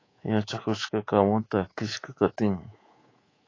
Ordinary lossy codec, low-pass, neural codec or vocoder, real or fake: AAC, 32 kbps; 7.2 kHz; codec, 24 kHz, 3.1 kbps, DualCodec; fake